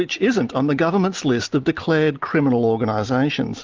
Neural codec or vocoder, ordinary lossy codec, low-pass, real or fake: none; Opus, 24 kbps; 7.2 kHz; real